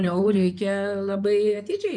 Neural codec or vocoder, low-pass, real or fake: codec, 16 kHz in and 24 kHz out, 2.2 kbps, FireRedTTS-2 codec; 9.9 kHz; fake